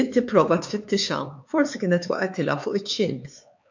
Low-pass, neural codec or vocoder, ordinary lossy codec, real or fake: 7.2 kHz; codec, 16 kHz, 4 kbps, X-Codec, HuBERT features, trained on LibriSpeech; MP3, 48 kbps; fake